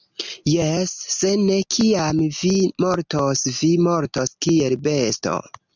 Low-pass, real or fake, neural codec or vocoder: 7.2 kHz; real; none